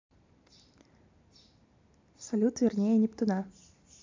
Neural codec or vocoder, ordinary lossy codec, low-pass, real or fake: none; MP3, 64 kbps; 7.2 kHz; real